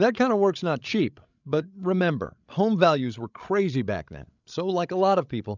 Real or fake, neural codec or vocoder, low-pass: fake; codec, 16 kHz, 16 kbps, FreqCodec, larger model; 7.2 kHz